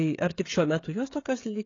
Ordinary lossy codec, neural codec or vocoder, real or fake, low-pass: AAC, 32 kbps; codec, 16 kHz, 16 kbps, FreqCodec, smaller model; fake; 7.2 kHz